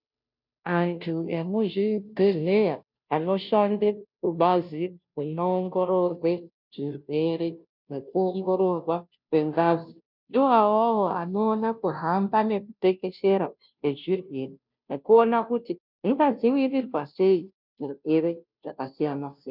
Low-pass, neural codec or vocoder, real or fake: 5.4 kHz; codec, 16 kHz, 0.5 kbps, FunCodec, trained on Chinese and English, 25 frames a second; fake